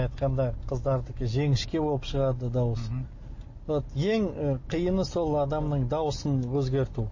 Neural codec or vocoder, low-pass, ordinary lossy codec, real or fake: none; 7.2 kHz; MP3, 32 kbps; real